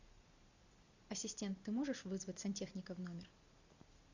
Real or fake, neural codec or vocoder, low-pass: real; none; 7.2 kHz